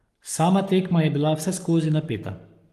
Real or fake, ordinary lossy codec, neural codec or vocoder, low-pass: fake; Opus, 32 kbps; codec, 44.1 kHz, 7.8 kbps, Pupu-Codec; 14.4 kHz